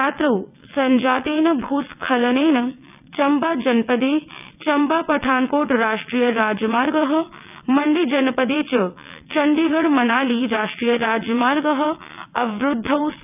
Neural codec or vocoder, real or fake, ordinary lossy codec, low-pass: vocoder, 22.05 kHz, 80 mel bands, WaveNeXt; fake; none; 3.6 kHz